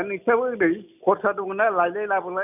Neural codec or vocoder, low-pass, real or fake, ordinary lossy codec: none; 3.6 kHz; real; none